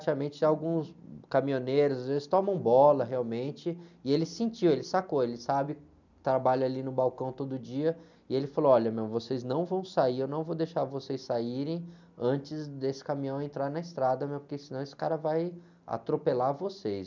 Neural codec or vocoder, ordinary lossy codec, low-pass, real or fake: none; none; 7.2 kHz; real